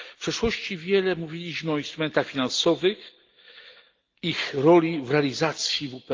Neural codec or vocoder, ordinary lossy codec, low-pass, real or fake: none; Opus, 32 kbps; 7.2 kHz; real